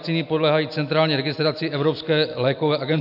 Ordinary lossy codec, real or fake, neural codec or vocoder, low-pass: AAC, 48 kbps; real; none; 5.4 kHz